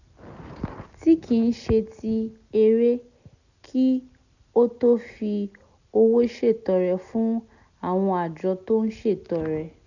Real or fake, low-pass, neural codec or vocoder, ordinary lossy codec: real; 7.2 kHz; none; none